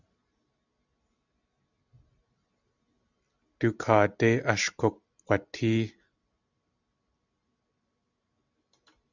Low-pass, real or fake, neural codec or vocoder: 7.2 kHz; real; none